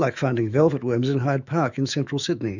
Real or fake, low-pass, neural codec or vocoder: fake; 7.2 kHz; autoencoder, 48 kHz, 128 numbers a frame, DAC-VAE, trained on Japanese speech